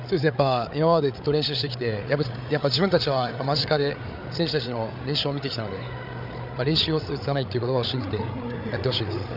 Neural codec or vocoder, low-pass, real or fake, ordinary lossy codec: codec, 16 kHz, 8 kbps, FreqCodec, larger model; 5.4 kHz; fake; none